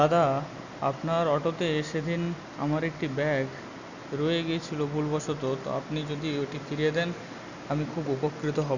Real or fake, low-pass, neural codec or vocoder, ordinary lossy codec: real; 7.2 kHz; none; none